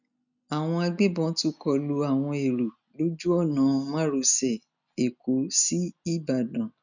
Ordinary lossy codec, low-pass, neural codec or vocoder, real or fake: none; 7.2 kHz; none; real